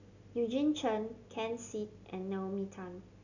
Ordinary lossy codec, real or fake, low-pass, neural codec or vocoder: none; real; 7.2 kHz; none